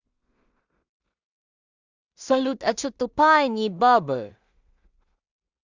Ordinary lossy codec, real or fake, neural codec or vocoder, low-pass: Opus, 64 kbps; fake; codec, 16 kHz in and 24 kHz out, 0.4 kbps, LongCat-Audio-Codec, two codebook decoder; 7.2 kHz